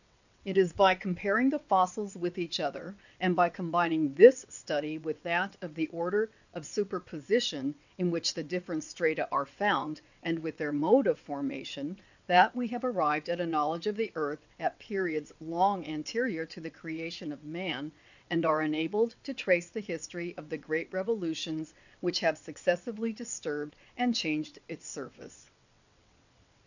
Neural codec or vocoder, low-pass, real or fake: vocoder, 22.05 kHz, 80 mel bands, WaveNeXt; 7.2 kHz; fake